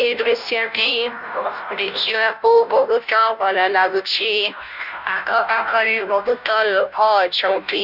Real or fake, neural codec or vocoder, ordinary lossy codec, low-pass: fake; codec, 16 kHz, 0.5 kbps, FunCodec, trained on Chinese and English, 25 frames a second; none; 5.4 kHz